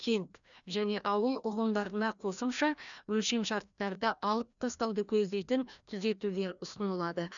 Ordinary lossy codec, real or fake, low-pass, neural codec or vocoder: none; fake; 7.2 kHz; codec, 16 kHz, 1 kbps, FreqCodec, larger model